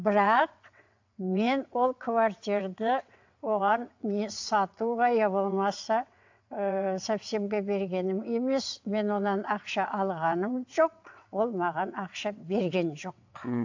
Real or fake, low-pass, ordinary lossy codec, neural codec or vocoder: fake; 7.2 kHz; AAC, 48 kbps; vocoder, 22.05 kHz, 80 mel bands, WaveNeXt